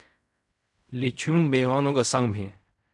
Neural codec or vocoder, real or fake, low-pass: codec, 16 kHz in and 24 kHz out, 0.4 kbps, LongCat-Audio-Codec, fine tuned four codebook decoder; fake; 10.8 kHz